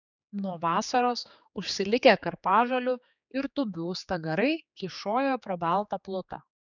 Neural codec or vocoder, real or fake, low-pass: codec, 16 kHz, 4 kbps, X-Codec, HuBERT features, trained on general audio; fake; 7.2 kHz